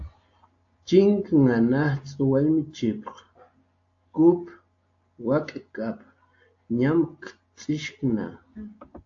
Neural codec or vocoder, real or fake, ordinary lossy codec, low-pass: none; real; AAC, 48 kbps; 7.2 kHz